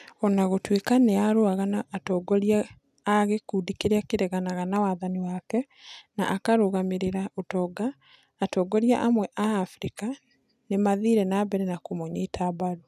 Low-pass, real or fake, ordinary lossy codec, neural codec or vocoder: 14.4 kHz; real; none; none